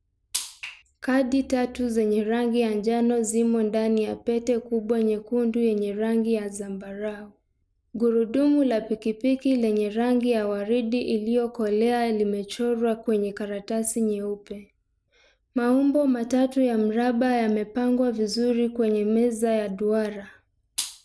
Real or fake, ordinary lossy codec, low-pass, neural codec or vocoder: real; none; none; none